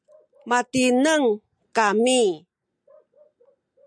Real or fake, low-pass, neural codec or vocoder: real; 9.9 kHz; none